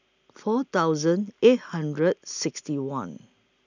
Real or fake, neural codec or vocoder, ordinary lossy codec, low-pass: real; none; none; 7.2 kHz